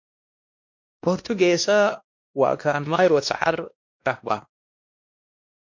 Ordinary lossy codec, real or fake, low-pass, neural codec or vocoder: MP3, 48 kbps; fake; 7.2 kHz; codec, 16 kHz, 1 kbps, X-Codec, WavLM features, trained on Multilingual LibriSpeech